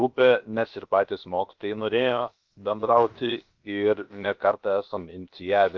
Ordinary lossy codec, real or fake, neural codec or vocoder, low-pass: Opus, 32 kbps; fake; codec, 16 kHz, about 1 kbps, DyCAST, with the encoder's durations; 7.2 kHz